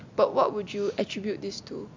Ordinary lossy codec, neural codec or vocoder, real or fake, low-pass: MP3, 64 kbps; none; real; 7.2 kHz